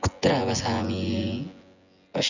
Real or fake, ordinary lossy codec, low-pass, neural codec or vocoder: fake; none; 7.2 kHz; vocoder, 24 kHz, 100 mel bands, Vocos